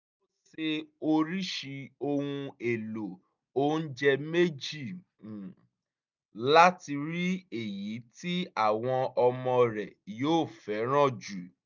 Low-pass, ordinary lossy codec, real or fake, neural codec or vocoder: 7.2 kHz; none; real; none